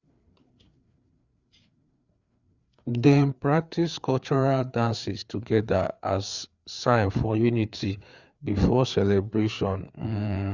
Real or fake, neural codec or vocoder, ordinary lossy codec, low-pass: fake; codec, 16 kHz, 4 kbps, FreqCodec, larger model; Opus, 64 kbps; 7.2 kHz